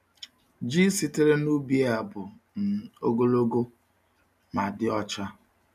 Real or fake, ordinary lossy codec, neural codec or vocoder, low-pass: real; none; none; 14.4 kHz